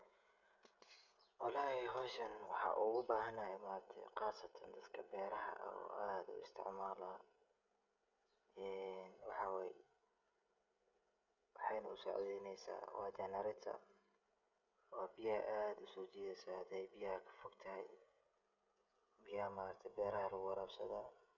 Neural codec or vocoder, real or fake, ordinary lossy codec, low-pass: codec, 16 kHz, 16 kbps, FreqCodec, smaller model; fake; none; 7.2 kHz